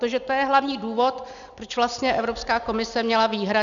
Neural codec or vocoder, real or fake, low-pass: none; real; 7.2 kHz